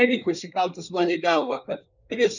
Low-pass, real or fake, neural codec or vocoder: 7.2 kHz; fake; codec, 24 kHz, 1 kbps, SNAC